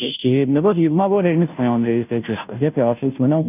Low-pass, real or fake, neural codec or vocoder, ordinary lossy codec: 3.6 kHz; fake; codec, 16 kHz, 0.5 kbps, FunCodec, trained on Chinese and English, 25 frames a second; none